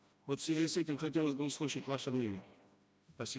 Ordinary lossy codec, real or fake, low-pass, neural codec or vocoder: none; fake; none; codec, 16 kHz, 1 kbps, FreqCodec, smaller model